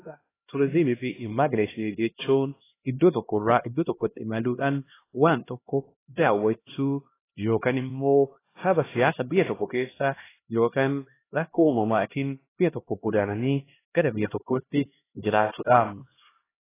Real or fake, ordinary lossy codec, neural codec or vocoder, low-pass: fake; AAC, 16 kbps; codec, 16 kHz, 1 kbps, X-Codec, HuBERT features, trained on LibriSpeech; 3.6 kHz